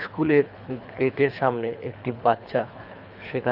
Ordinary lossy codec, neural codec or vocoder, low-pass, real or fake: none; codec, 24 kHz, 3 kbps, HILCodec; 5.4 kHz; fake